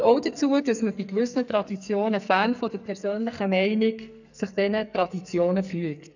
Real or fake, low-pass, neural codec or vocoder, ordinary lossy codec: fake; 7.2 kHz; codec, 44.1 kHz, 2.6 kbps, SNAC; none